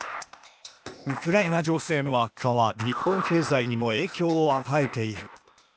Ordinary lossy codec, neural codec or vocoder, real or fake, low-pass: none; codec, 16 kHz, 0.8 kbps, ZipCodec; fake; none